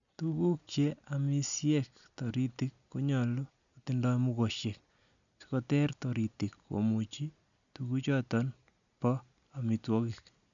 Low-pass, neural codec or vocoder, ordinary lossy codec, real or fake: 7.2 kHz; none; none; real